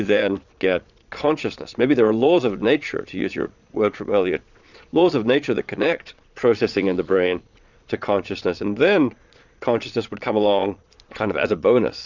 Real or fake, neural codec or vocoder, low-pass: fake; vocoder, 22.05 kHz, 80 mel bands, Vocos; 7.2 kHz